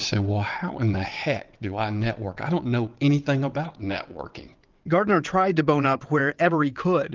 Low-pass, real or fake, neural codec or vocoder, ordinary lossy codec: 7.2 kHz; fake; vocoder, 22.05 kHz, 80 mel bands, WaveNeXt; Opus, 32 kbps